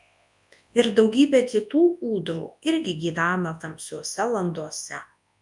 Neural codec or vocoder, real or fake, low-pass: codec, 24 kHz, 0.9 kbps, WavTokenizer, large speech release; fake; 10.8 kHz